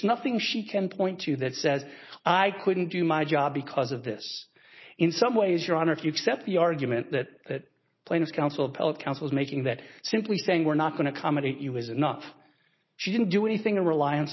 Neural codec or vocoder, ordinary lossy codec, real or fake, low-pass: none; MP3, 24 kbps; real; 7.2 kHz